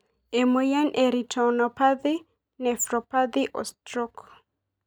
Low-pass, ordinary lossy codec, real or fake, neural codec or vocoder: 19.8 kHz; none; real; none